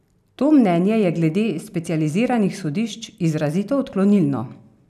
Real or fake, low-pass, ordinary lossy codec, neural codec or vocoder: real; 14.4 kHz; none; none